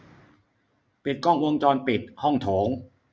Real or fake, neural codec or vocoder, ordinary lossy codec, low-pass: real; none; none; none